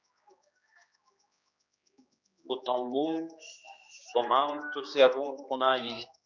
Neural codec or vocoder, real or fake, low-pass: codec, 16 kHz, 2 kbps, X-Codec, HuBERT features, trained on general audio; fake; 7.2 kHz